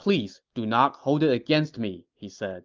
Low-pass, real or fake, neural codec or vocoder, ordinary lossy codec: 7.2 kHz; real; none; Opus, 24 kbps